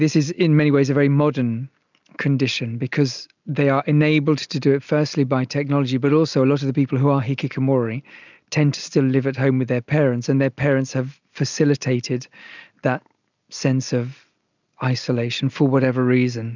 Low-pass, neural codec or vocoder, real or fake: 7.2 kHz; none; real